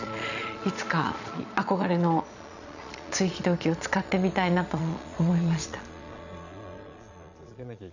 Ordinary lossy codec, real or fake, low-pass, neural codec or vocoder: none; fake; 7.2 kHz; vocoder, 22.05 kHz, 80 mel bands, Vocos